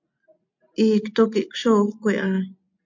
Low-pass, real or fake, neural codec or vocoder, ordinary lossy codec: 7.2 kHz; real; none; MP3, 64 kbps